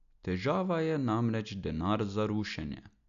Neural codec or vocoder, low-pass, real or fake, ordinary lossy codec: none; 7.2 kHz; real; none